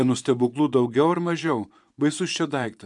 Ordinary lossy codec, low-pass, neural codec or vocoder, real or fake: MP3, 96 kbps; 10.8 kHz; vocoder, 24 kHz, 100 mel bands, Vocos; fake